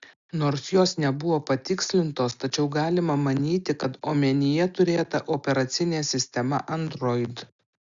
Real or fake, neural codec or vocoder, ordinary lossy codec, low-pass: real; none; Opus, 64 kbps; 7.2 kHz